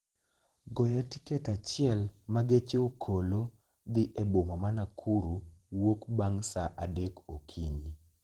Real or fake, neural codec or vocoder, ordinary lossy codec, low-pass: fake; vocoder, 44.1 kHz, 128 mel bands every 512 samples, BigVGAN v2; Opus, 16 kbps; 19.8 kHz